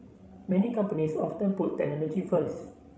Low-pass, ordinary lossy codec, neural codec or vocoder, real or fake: none; none; codec, 16 kHz, 16 kbps, FreqCodec, larger model; fake